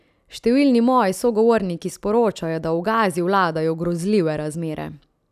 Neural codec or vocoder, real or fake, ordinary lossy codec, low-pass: none; real; none; 14.4 kHz